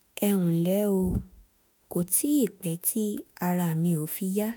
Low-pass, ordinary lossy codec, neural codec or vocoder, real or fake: none; none; autoencoder, 48 kHz, 32 numbers a frame, DAC-VAE, trained on Japanese speech; fake